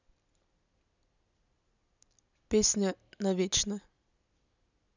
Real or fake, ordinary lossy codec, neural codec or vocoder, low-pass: real; none; none; 7.2 kHz